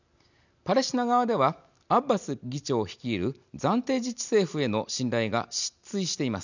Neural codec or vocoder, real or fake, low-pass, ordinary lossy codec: none; real; 7.2 kHz; none